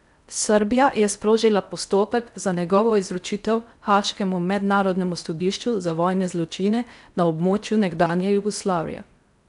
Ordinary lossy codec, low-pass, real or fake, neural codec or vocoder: none; 10.8 kHz; fake; codec, 16 kHz in and 24 kHz out, 0.6 kbps, FocalCodec, streaming, 4096 codes